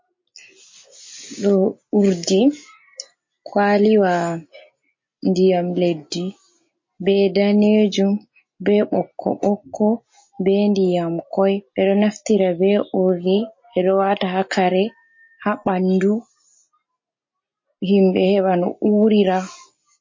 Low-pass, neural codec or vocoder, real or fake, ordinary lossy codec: 7.2 kHz; none; real; MP3, 32 kbps